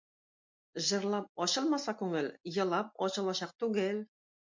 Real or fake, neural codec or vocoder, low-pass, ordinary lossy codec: real; none; 7.2 kHz; MP3, 48 kbps